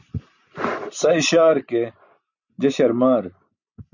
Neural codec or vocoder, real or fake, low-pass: none; real; 7.2 kHz